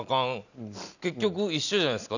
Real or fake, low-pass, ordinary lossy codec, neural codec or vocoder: real; 7.2 kHz; none; none